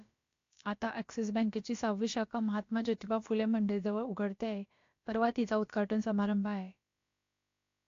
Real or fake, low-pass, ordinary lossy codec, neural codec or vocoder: fake; 7.2 kHz; AAC, 48 kbps; codec, 16 kHz, about 1 kbps, DyCAST, with the encoder's durations